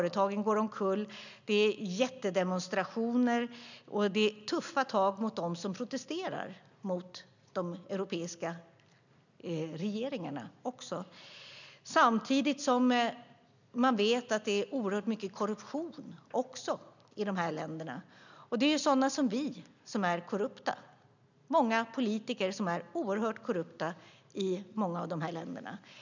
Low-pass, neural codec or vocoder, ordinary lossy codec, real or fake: 7.2 kHz; none; none; real